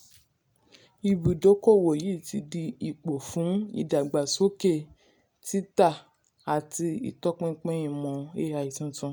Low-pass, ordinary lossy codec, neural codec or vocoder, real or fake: none; none; none; real